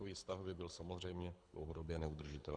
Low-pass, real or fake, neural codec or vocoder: 9.9 kHz; fake; codec, 24 kHz, 6 kbps, HILCodec